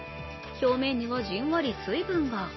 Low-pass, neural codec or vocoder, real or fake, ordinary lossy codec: 7.2 kHz; none; real; MP3, 24 kbps